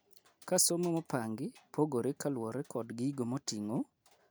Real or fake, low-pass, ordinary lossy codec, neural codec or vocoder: real; none; none; none